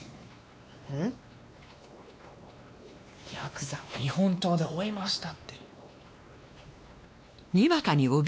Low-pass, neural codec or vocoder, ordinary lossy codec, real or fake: none; codec, 16 kHz, 2 kbps, X-Codec, WavLM features, trained on Multilingual LibriSpeech; none; fake